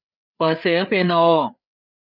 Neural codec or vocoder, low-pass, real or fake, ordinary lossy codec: codec, 16 kHz, 4 kbps, FreqCodec, larger model; 5.4 kHz; fake; none